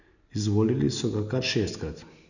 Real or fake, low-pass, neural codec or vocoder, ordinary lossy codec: real; 7.2 kHz; none; none